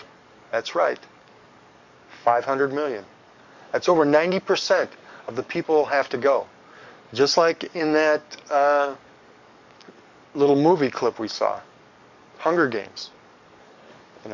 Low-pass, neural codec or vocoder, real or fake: 7.2 kHz; codec, 44.1 kHz, 7.8 kbps, DAC; fake